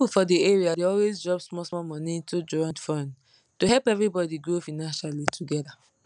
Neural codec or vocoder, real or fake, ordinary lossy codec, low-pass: none; real; none; 9.9 kHz